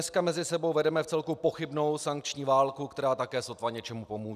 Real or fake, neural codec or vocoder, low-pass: real; none; 14.4 kHz